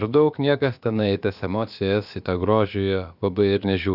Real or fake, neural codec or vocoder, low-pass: fake; codec, 16 kHz, about 1 kbps, DyCAST, with the encoder's durations; 5.4 kHz